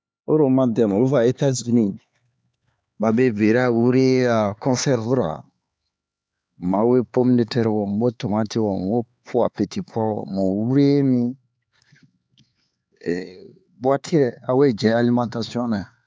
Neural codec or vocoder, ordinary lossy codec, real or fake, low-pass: codec, 16 kHz, 4 kbps, X-Codec, HuBERT features, trained on LibriSpeech; none; fake; none